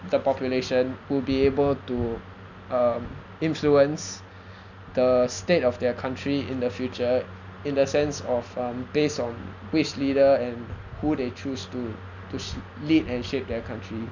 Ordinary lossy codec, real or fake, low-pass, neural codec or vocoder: none; real; 7.2 kHz; none